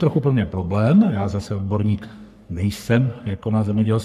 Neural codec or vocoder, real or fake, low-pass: codec, 44.1 kHz, 3.4 kbps, Pupu-Codec; fake; 14.4 kHz